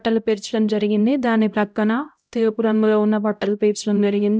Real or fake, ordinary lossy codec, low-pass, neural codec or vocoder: fake; none; none; codec, 16 kHz, 0.5 kbps, X-Codec, HuBERT features, trained on LibriSpeech